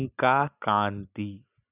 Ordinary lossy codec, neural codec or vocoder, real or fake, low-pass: none; none; real; 3.6 kHz